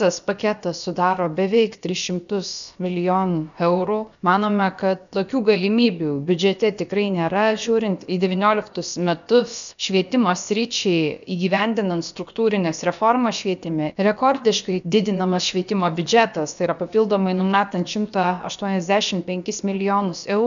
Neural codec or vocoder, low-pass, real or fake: codec, 16 kHz, about 1 kbps, DyCAST, with the encoder's durations; 7.2 kHz; fake